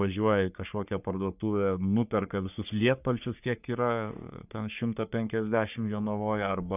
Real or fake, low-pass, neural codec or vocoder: fake; 3.6 kHz; codec, 44.1 kHz, 3.4 kbps, Pupu-Codec